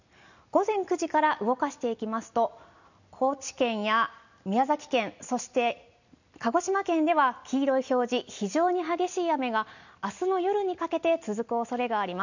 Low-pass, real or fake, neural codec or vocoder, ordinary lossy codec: 7.2 kHz; real; none; none